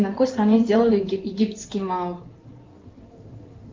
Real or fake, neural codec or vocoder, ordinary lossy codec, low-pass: fake; codec, 16 kHz in and 24 kHz out, 2.2 kbps, FireRedTTS-2 codec; Opus, 24 kbps; 7.2 kHz